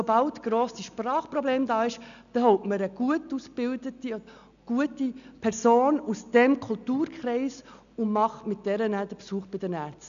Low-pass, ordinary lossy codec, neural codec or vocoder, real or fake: 7.2 kHz; none; none; real